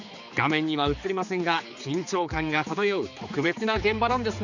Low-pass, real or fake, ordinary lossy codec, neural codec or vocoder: 7.2 kHz; fake; none; codec, 16 kHz, 4 kbps, X-Codec, HuBERT features, trained on general audio